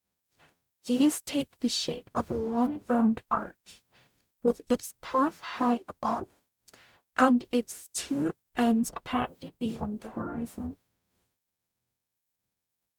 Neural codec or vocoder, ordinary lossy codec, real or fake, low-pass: codec, 44.1 kHz, 0.9 kbps, DAC; none; fake; 19.8 kHz